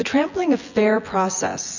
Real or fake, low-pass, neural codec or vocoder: fake; 7.2 kHz; vocoder, 24 kHz, 100 mel bands, Vocos